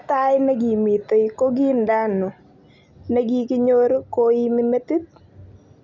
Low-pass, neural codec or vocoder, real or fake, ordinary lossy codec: 7.2 kHz; none; real; none